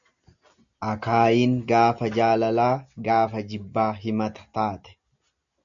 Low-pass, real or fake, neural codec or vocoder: 7.2 kHz; real; none